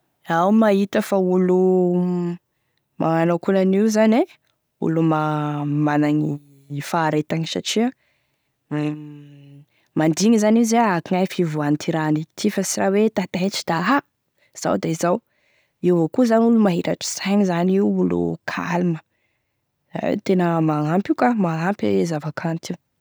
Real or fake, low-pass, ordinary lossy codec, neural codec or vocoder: real; none; none; none